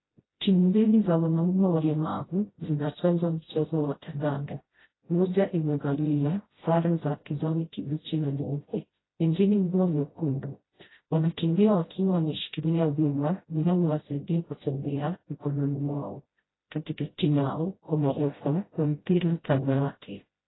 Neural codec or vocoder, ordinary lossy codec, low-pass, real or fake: codec, 16 kHz, 0.5 kbps, FreqCodec, smaller model; AAC, 16 kbps; 7.2 kHz; fake